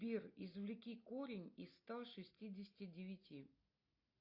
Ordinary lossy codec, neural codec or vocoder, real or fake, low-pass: AAC, 48 kbps; none; real; 5.4 kHz